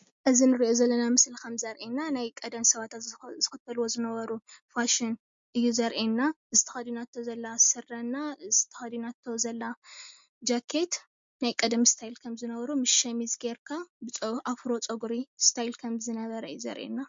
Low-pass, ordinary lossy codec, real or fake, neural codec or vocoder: 7.2 kHz; MP3, 48 kbps; real; none